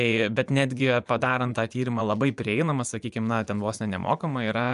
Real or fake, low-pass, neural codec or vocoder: fake; 10.8 kHz; vocoder, 24 kHz, 100 mel bands, Vocos